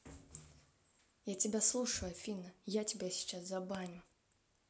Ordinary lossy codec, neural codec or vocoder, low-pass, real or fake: none; none; none; real